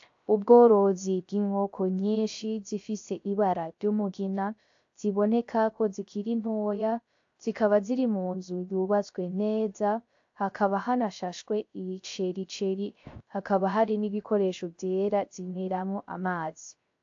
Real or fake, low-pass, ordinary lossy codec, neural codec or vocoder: fake; 7.2 kHz; AAC, 48 kbps; codec, 16 kHz, 0.3 kbps, FocalCodec